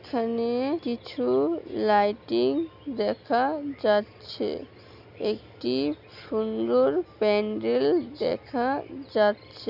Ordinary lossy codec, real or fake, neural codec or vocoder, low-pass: none; real; none; 5.4 kHz